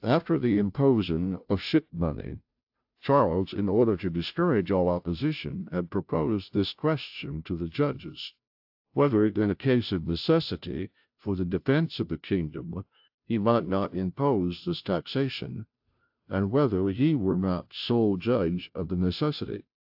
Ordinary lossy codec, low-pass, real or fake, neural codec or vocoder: AAC, 48 kbps; 5.4 kHz; fake; codec, 16 kHz, 0.5 kbps, FunCodec, trained on Chinese and English, 25 frames a second